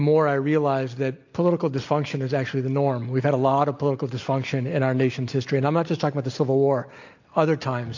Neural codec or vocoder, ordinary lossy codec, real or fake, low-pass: none; AAC, 48 kbps; real; 7.2 kHz